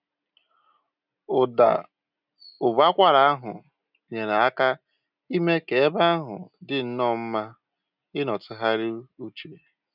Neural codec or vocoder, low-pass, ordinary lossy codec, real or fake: none; 5.4 kHz; none; real